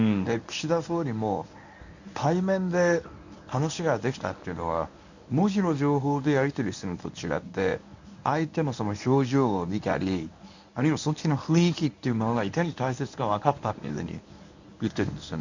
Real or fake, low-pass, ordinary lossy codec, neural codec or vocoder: fake; 7.2 kHz; none; codec, 24 kHz, 0.9 kbps, WavTokenizer, medium speech release version 1